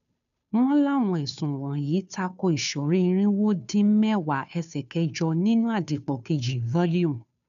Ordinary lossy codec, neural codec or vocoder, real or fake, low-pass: none; codec, 16 kHz, 2 kbps, FunCodec, trained on Chinese and English, 25 frames a second; fake; 7.2 kHz